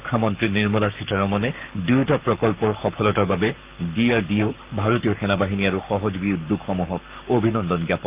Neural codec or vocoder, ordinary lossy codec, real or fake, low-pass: codec, 44.1 kHz, 7.8 kbps, Pupu-Codec; Opus, 24 kbps; fake; 3.6 kHz